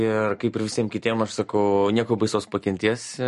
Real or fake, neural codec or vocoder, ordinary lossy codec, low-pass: real; none; MP3, 48 kbps; 14.4 kHz